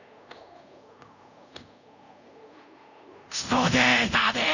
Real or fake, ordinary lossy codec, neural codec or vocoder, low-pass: fake; none; codec, 24 kHz, 0.5 kbps, DualCodec; 7.2 kHz